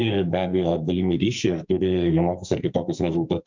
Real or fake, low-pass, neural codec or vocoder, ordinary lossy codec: fake; 7.2 kHz; codec, 44.1 kHz, 2.6 kbps, SNAC; MP3, 64 kbps